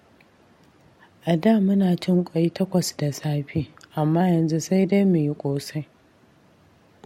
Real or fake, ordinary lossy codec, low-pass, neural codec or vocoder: real; MP3, 64 kbps; 19.8 kHz; none